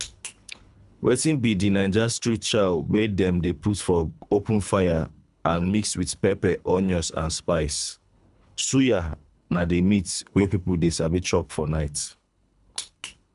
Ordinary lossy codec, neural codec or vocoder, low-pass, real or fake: none; codec, 24 kHz, 3 kbps, HILCodec; 10.8 kHz; fake